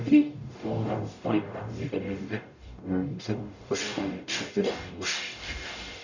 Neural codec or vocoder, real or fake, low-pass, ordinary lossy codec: codec, 44.1 kHz, 0.9 kbps, DAC; fake; 7.2 kHz; none